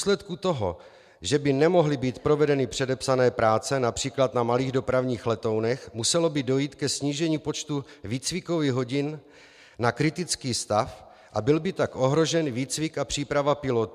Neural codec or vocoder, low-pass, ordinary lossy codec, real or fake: none; 14.4 kHz; MP3, 96 kbps; real